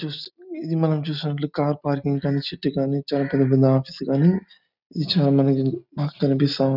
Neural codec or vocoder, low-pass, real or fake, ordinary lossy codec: none; 5.4 kHz; real; none